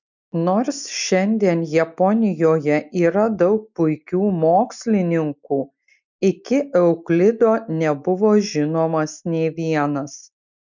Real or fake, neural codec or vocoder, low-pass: real; none; 7.2 kHz